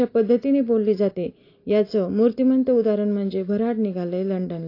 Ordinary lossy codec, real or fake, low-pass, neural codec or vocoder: AAC, 32 kbps; real; 5.4 kHz; none